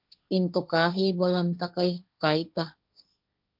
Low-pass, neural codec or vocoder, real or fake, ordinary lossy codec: 5.4 kHz; codec, 16 kHz, 1.1 kbps, Voila-Tokenizer; fake; MP3, 48 kbps